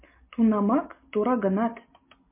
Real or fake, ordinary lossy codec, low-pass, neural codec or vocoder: real; MP3, 24 kbps; 3.6 kHz; none